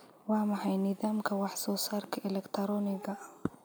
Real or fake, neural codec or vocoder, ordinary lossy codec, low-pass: real; none; none; none